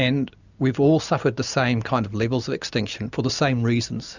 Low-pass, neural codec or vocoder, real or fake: 7.2 kHz; none; real